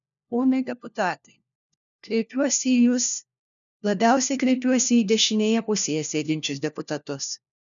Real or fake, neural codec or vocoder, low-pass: fake; codec, 16 kHz, 1 kbps, FunCodec, trained on LibriTTS, 50 frames a second; 7.2 kHz